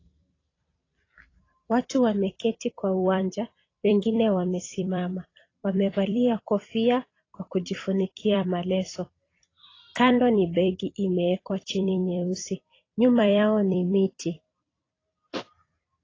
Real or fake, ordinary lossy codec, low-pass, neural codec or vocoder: fake; AAC, 32 kbps; 7.2 kHz; vocoder, 44.1 kHz, 128 mel bands every 256 samples, BigVGAN v2